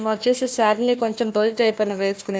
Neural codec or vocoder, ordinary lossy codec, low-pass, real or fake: codec, 16 kHz, 1 kbps, FunCodec, trained on Chinese and English, 50 frames a second; none; none; fake